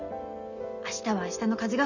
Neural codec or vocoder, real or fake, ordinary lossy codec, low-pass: none; real; none; 7.2 kHz